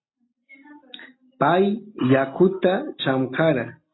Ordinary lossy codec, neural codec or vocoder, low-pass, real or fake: AAC, 16 kbps; none; 7.2 kHz; real